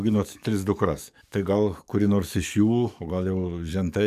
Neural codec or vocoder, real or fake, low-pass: codec, 44.1 kHz, 7.8 kbps, DAC; fake; 14.4 kHz